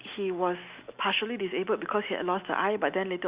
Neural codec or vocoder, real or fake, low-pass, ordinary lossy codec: none; real; 3.6 kHz; Opus, 64 kbps